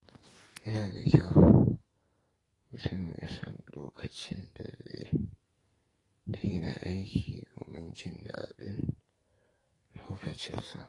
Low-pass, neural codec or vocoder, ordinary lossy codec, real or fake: 10.8 kHz; codec, 44.1 kHz, 2.6 kbps, SNAC; AAC, 32 kbps; fake